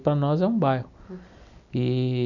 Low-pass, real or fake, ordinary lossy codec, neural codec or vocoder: 7.2 kHz; real; none; none